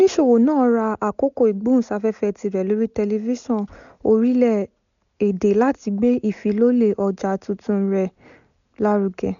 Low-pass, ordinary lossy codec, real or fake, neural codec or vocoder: 7.2 kHz; none; real; none